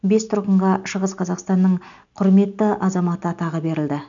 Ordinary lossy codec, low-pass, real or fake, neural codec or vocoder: none; 7.2 kHz; real; none